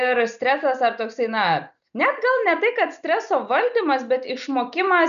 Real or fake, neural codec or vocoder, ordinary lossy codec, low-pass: real; none; MP3, 96 kbps; 7.2 kHz